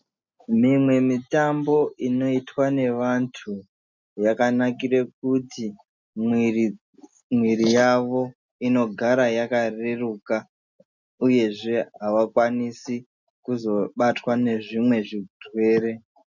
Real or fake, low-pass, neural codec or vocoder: real; 7.2 kHz; none